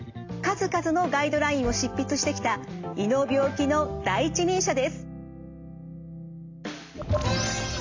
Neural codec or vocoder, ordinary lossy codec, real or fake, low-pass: none; AAC, 48 kbps; real; 7.2 kHz